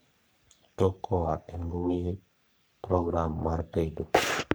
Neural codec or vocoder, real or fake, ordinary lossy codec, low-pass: codec, 44.1 kHz, 3.4 kbps, Pupu-Codec; fake; none; none